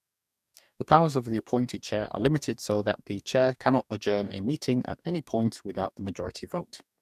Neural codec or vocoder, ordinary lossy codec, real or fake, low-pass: codec, 44.1 kHz, 2.6 kbps, DAC; none; fake; 14.4 kHz